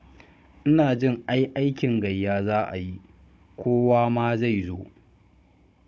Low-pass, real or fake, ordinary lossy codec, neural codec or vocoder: none; fake; none; codec, 16 kHz, 16 kbps, FunCodec, trained on Chinese and English, 50 frames a second